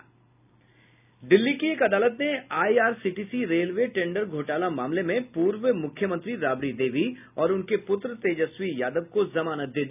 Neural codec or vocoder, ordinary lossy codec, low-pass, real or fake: none; none; 3.6 kHz; real